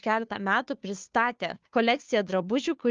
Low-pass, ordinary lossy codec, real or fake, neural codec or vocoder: 7.2 kHz; Opus, 32 kbps; fake; codec, 16 kHz, 2 kbps, FunCodec, trained on Chinese and English, 25 frames a second